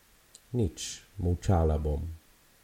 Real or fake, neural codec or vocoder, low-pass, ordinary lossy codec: real; none; 19.8 kHz; MP3, 64 kbps